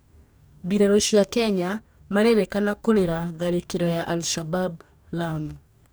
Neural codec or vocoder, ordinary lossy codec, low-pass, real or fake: codec, 44.1 kHz, 2.6 kbps, DAC; none; none; fake